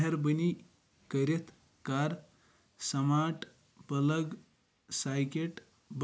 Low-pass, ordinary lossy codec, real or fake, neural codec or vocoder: none; none; real; none